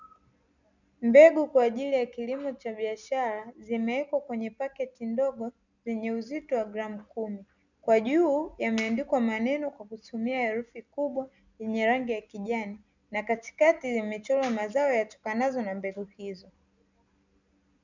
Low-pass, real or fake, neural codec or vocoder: 7.2 kHz; real; none